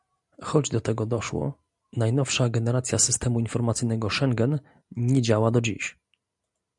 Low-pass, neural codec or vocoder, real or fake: 10.8 kHz; none; real